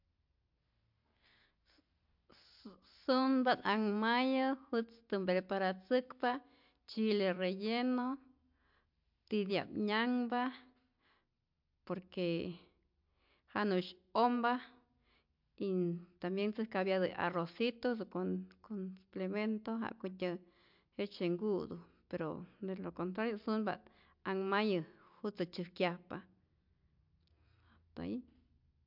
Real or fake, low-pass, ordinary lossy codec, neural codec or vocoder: real; 5.4 kHz; MP3, 48 kbps; none